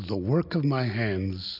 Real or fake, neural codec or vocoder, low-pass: real; none; 5.4 kHz